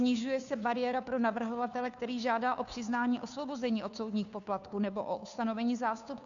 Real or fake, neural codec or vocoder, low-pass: fake; codec, 16 kHz, 2 kbps, FunCodec, trained on Chinese and English, 25 frames a second; 7.2 kHz